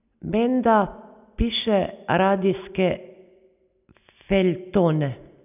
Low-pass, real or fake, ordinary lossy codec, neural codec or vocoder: 3.6 kHz; real; none; none